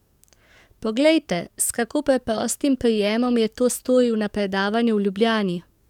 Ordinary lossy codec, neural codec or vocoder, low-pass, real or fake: none; codec, 44.1 kHz, 7.8 kbps, DAC; 19.8 kHz; fake